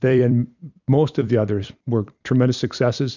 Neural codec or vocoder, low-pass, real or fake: vocoder, 44.1 kHz, 128 mel bands every 256 samples, BigVGAN v2; 7.2 kHz; fake